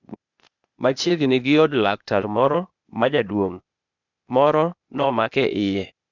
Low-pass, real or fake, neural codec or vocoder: 7.2 kHz; fake; codec, 16 kHz, 0.8 kbps, ZipCodec